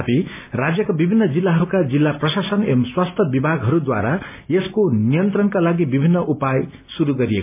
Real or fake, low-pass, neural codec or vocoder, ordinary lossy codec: real; 3.6 kHz; none; MP3, 32 kbps